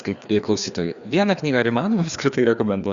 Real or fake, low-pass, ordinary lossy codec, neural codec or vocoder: fake; 7.2 kHz; Opus, 64 kbps; codec, 16 kHz, 2 kbps, FreqCodec, larger model